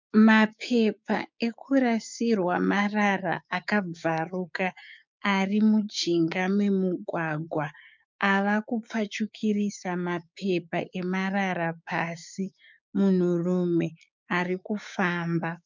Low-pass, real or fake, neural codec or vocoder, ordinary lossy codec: 7.2 kHz; fake; codec, 16 kHz, 6 kbps, DAC; MP3, 64 kbps